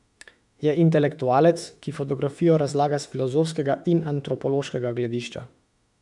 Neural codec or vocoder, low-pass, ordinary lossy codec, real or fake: autoencoder, 48 kHz, 32 numbers a frame, DAC-VAE, trained on Japanese speech; 10.8 kHz; none; fake